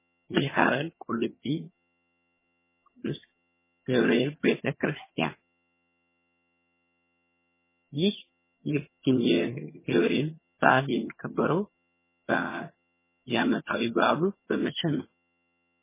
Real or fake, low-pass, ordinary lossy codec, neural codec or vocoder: fake; 3.6 kHz; MP3, 16 kbps; vocoder, 22.05 kHz, 80 mel bands, HiFi-GAN